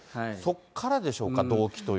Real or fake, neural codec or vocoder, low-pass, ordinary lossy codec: real; none; none; none